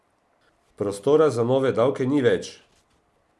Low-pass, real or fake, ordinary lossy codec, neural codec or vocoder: none; real; none; none